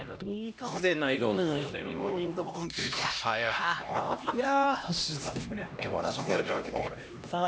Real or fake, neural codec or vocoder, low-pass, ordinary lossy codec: fake; codec, 16 kHz, 1 kbps, X-Codec, HuBERT features, trained on LibriSpeech; none; none